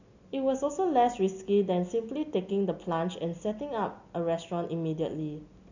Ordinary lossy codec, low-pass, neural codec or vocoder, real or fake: none; 7.2 kHz; none; real